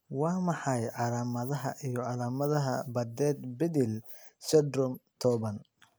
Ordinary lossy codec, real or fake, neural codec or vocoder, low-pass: none; real; none; none